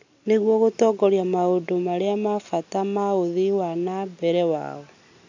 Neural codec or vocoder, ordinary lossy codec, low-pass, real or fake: none; AAC, 48 kbps; 7.2 kHz; real